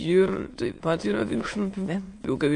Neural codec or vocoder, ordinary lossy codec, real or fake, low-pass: autoencoder, 22.05 kHz, a latent of 192 numbers a frame, VITS, trained on many speakers; AAC, 48 kbps; fake; 9.9 kHz